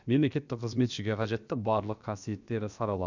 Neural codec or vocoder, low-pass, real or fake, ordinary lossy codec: codec, 16 kHz, about 1 kbps, DyCAST, with the encoder's durations; 7.2 kHz; fake; none